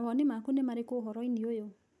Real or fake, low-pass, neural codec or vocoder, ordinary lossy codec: real; none; none; none